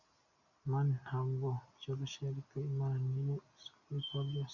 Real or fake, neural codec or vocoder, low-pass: real; none; 7.2 kHz